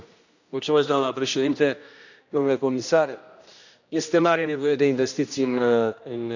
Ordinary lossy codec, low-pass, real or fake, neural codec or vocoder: none; 7.2 kHz; fake; codec, 16 kHz, 1 kbps, X-Codec, HuBERT features, trained on general audio